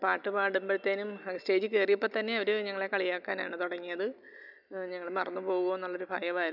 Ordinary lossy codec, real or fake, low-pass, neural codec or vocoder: none; real; 5.4 kHz; none